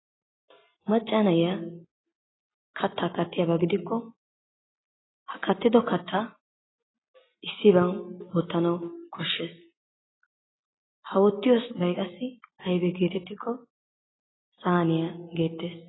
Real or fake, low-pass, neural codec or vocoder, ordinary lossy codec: real; 7.2 kHz; none; AAC, 16 kbps